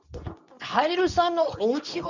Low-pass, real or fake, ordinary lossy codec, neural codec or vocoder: 7.2 kHz; fake; none; codec, 24 kHz, 0.9 kbps, WavTokenizer, medium speech release version 2